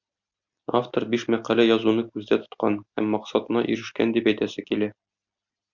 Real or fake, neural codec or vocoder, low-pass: real; none; 7.2 kHz